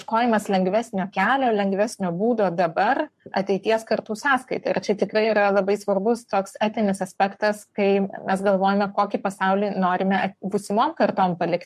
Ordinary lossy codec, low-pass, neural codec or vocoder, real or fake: MP3, 64 kbps; 14.4 kHz; codec, 44.1 kHz, 7.8 kbps, Pupu-Codec; fake